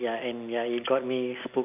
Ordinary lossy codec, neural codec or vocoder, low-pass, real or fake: none; none; 3.6 kHz; real